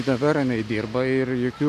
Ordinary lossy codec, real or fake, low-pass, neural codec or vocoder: MP3, 64 kbps; real; 14.4 kHz; none